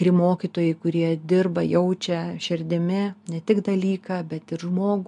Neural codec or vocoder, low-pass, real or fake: none; 10.8 kHz; real